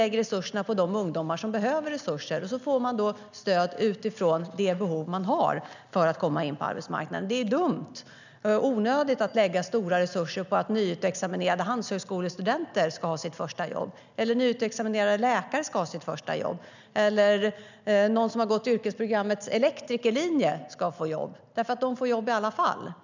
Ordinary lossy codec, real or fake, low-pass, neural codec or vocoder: none; real; 7.2 kHz; none